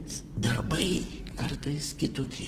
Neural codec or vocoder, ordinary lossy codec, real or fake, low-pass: codec, 44.1 kHz, 2.6 kbps, SNAC; Opus, 16 kbps; fake; 14.4 kHz